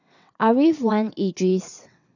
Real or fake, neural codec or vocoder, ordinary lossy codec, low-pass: fake; vocoder, 22.05 kHz, 80 mel bands, WaveNeXt; none; 7.2 kHz